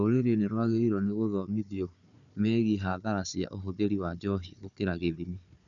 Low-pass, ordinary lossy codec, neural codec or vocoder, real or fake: 7.2 kHz; none; codec, 16 kHz, 4 kbps, FunCodec, trained on Chinese and English, 50 frames a second; fake